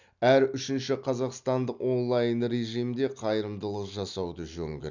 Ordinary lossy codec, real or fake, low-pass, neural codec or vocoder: none; real; 7.2 kHz; none